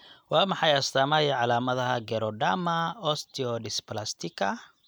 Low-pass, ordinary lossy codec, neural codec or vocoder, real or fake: none; none; none; real